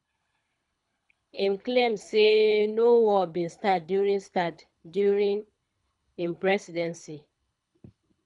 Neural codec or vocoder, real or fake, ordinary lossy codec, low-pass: codec, 24 kHz, 3 kbps, HILCodec; fake; none; 10.8 kHz